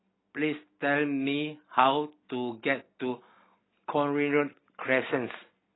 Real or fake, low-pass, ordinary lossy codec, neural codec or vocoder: real; 7.2 kHz; AAC, 16 kbps; none